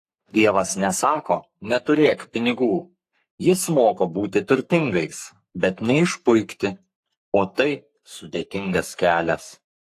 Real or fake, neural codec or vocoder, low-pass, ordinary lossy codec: fake; codec, 44.1 kHz, 3.4 kbps, Pupu-Codec; 14.4 kHz; AAC, 64 kbps